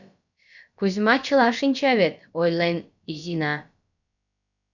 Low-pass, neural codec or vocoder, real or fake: 7.2 kHz; codec, 16 kHz, about 1 kbps, DyCAST, with the encoder's durations; fake